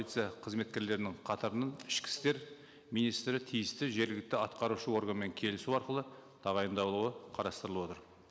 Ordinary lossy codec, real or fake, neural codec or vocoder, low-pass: none; real; none; none